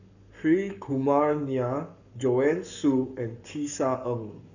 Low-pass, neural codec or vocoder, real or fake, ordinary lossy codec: 7.2 kHz; codec, 44.1 kHz, 7.8 kbps, Pupu-Codec; fake; none